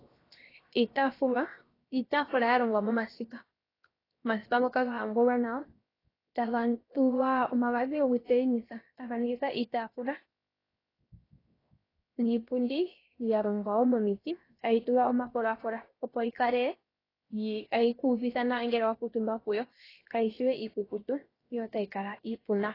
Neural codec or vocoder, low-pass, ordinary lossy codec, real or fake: codec, 16 kHz, 0.7 kbps, FocalCodec; 5.4 kHz; AAC, 24 kbps; fake